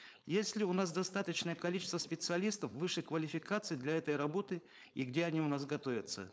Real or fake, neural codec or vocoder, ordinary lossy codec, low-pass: fake; codec, 16 kHz, 4.8 kbps, FACodec; none; none